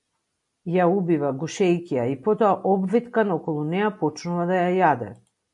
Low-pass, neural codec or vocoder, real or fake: 10.8 kHz; none; real